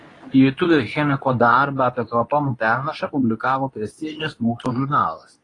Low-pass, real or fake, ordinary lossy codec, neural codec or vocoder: 10.8 kHz; fake; AAC, 32 kbps; codec, 24 kHz, 0.9 kbps, WavTokenizer, medium speech release version 2